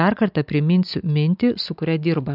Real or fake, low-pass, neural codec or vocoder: real; 5.4 kHz; none